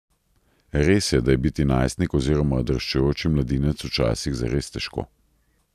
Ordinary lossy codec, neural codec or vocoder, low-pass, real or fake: none; none; 14.4 kHz; real